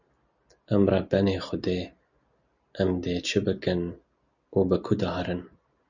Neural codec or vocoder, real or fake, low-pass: none; real; 7.2 kHz